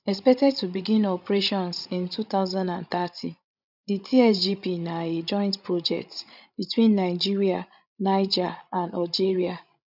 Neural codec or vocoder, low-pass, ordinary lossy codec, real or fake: codec, 16 kHz, 16 kbps, FreqCodec, larger model; 5.4 kHz; none; fake